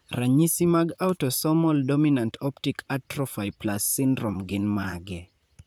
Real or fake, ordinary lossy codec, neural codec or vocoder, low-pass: fake; none; vocoder, 44.1 kHz, 128 mel bands, Pupu-Vocoder; none